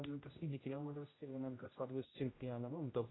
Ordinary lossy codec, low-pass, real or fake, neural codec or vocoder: AAC, 16 kbps; 7.2 kHz; fake; codec, 16 kHz, 0.5 kbps, X-Codec, HuBERT features, trained on general audio